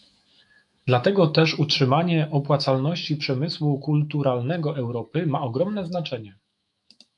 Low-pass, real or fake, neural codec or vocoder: 10.8 kHz; fake; autoencoder, 48 kHz, 128 numbers a frame, DAC-VAE, trained on Japanese speech